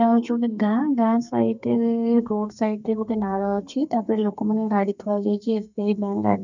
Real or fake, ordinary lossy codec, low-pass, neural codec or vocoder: fake; none; 7.2 kHz; codec, 32 kHz, 1.9 kbps, SNAC